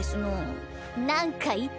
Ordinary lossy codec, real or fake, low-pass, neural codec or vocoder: none; real; none; none